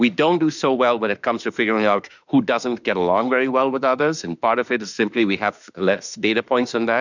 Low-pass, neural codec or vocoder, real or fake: 7.2 kHz; autoencoder, 48 kHz, 32 numbers a frame, DAC-VAE, trained on Japanese speech; fake